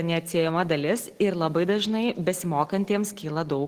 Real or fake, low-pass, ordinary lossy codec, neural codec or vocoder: real; 14.4 kHz; Opus, 16 kbps; none